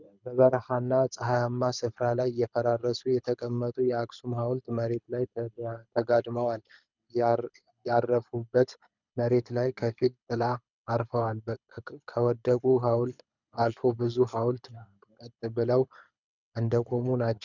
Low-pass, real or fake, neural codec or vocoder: 7.2 kHz; fake; codec, 24 kHz, 6 kbps, HILCodec